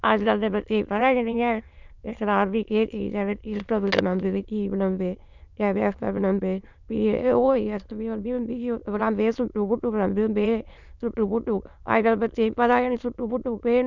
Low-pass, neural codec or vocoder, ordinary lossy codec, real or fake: 7.2 kHz; autoencoder, 22.05 kHz, a latent of 192 numbers a frame, VITS, trained on many speakers; none; fake